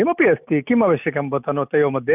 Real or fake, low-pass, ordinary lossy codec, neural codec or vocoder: real; 3.6 kHz; none; none